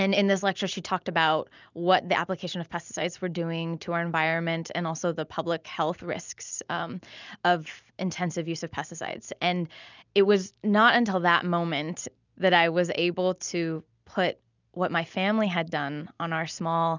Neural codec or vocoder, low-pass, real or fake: none; 7.2 kHz; real